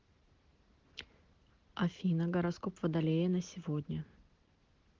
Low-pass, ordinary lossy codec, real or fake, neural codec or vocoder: 7.2 kHz; Opus, 16 kbps; real; none